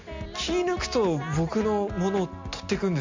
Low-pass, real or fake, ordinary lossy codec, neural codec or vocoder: 7.2 kHz; real; MP3, 64 kbps; none